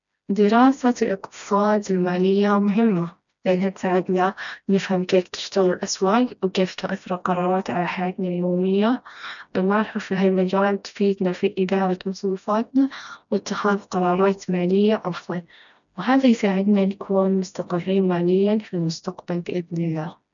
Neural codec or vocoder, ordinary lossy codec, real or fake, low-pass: codec, 16 kHz, 1 kbps, FreqCodec, smaller model; none; fake; 7.2 kHz